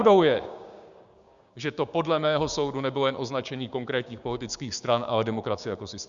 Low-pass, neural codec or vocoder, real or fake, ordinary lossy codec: 7.2 kHz; codec, 16 kHz, 6 kbps, DAC; fake; Opus, 64 kbps